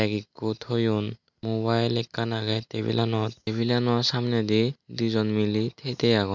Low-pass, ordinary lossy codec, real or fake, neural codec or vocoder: 7.2 kHz; MP3, 64 kbps; real; none